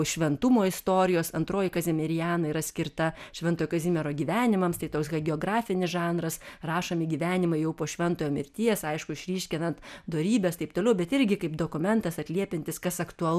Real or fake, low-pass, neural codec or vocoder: real; 14.4 kHz; none